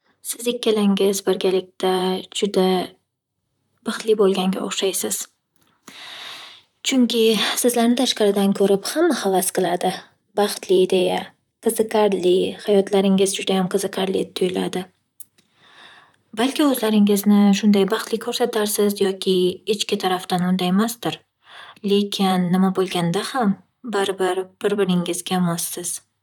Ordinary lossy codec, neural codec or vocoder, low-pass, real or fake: none; vocoder, 44.1 kHz, 128 mel bands, Pupu-Vocoder; 19.8 kHz; fake